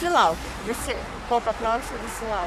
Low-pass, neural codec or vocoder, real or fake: 14.4 kHz; codec, 44.1 kHz, 3.4 kbps, Pupu-Codec; fake